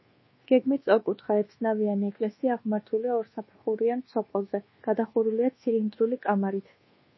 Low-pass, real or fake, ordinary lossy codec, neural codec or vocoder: 7.2 kHz; fake; MP3, 24 kbps; codec, 24 kHz, 1.2 kbps, DualCodec